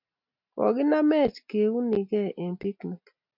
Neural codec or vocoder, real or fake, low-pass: none; real; 5.4 kHz